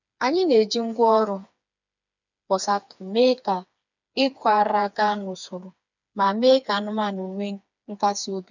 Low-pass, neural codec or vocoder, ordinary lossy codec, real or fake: 7.2 kHz; codec, 16 kHz, 4 kbps, FreqCodec, smaller model; none; fake